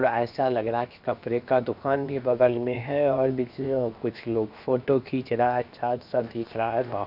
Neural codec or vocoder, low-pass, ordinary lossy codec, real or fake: codec, 16 kHz, 0.7 kbps, FocalCodec; 5.4 kHz; none; fake